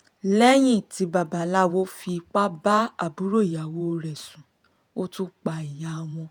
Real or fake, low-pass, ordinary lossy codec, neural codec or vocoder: fake; none; none; vocoder, 48 kHz, 128 mel bands, Vocos